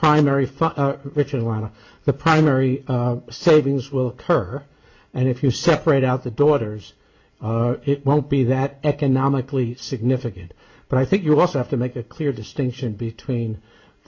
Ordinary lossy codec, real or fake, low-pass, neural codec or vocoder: MP3, 48 kbps; real; 7.2 kHz; none